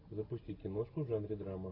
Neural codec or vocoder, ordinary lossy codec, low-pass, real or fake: none; AAC, 24 kbps; 5.4 kHz; real